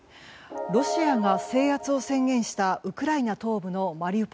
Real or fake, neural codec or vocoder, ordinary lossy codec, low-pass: real; none; none; none